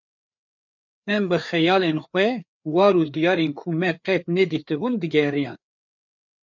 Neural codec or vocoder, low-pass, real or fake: codec, 16 kHz, 4 kbps, FreqCodec, larger model; 7.2 kHz; fake